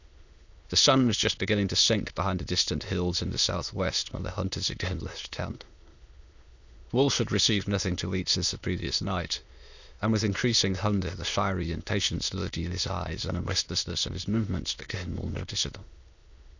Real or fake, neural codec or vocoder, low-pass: fake; autoencoder, 22.05 kHz, a latent of 192 numbers a frame, VITS, trained on many speakers; 7.2 kHz